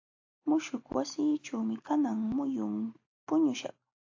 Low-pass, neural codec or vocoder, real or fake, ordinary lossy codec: 7.2 kHz; none; real; AAC, 48 kbps